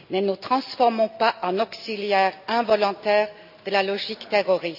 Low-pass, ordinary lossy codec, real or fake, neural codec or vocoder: 5.4 kHz; none; real; none